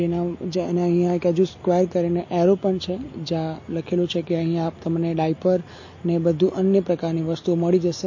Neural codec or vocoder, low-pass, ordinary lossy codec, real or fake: none; 7.2 kHz; MP3, 32 kbps; real